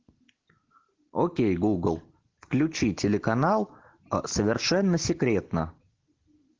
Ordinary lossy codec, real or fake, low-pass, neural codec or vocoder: Opus, 16 kbps; real; 7.2 kHz; none